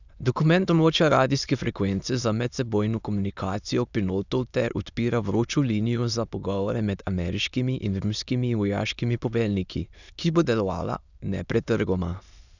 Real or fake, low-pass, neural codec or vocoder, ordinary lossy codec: fake; 7.2 kHz; autoencoder, 22.05 kHz, a latent of 192 numbers a frame, VITS, trained on many speakers; none